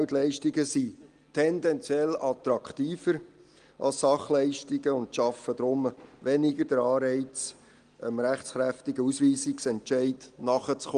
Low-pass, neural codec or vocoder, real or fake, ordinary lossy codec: 9.9 kHz; none; real; Opus, 24 kbps